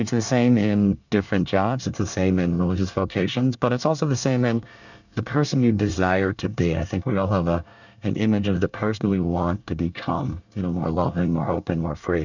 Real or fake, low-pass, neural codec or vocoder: fake; 7.2 kHz; codec, 24 kHz, 1 kbps, SNAC